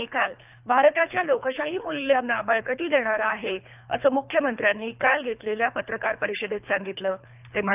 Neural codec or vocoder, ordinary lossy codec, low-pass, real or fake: codec, 24 kHz, 3 kbps, HILCodec; none; 3.6 kHz; fake